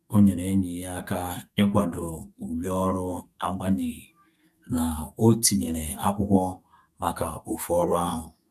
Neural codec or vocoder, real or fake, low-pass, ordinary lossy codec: codec, 32 kHz, 1.9 kbps, SNAC; fake; 14.4 kHz; none